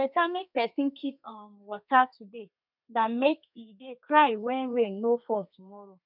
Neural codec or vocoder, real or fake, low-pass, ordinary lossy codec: codec, 32 kHz, 1.9 kbps, SNAC; fake; 5.4 kHz; none